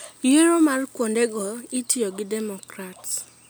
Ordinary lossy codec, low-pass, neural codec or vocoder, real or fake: none; none; none; real